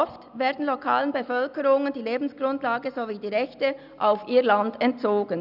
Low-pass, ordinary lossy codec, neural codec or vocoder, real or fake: 5.4 kHz; none; none; real